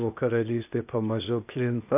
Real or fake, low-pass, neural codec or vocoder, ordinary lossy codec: fake; 3.6 kHz; codec, 16 kHz, 0.8 kbps, ZipCodec; AAC, 32 kbps